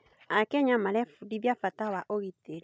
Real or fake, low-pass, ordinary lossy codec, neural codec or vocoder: real; none; none; none